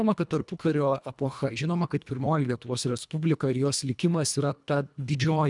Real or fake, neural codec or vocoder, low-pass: fake; codec, 24 kHz, 1.5 kbps, HILCodec; 10.8 kHz